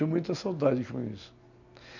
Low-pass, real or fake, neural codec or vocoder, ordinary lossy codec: 7.2 kHz; real; none; none